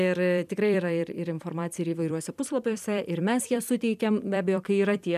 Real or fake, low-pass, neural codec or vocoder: fake; 14.4 kHz; vocoder, 44.1 kHz, 128 mel bands every 256 samples, BigVGAN v2